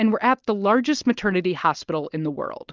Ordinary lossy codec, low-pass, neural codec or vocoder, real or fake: Opus, 24 kbps; 7.2 kHz; none; real